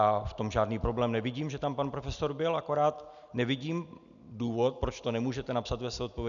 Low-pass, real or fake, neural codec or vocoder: 7.2 kHz; real; none